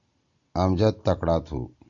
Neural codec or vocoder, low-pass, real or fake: none; 7.2 kHz; real